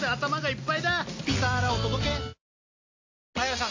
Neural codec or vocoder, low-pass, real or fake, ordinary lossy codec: none; 7.2 kHz; real; AAC, 48 kbps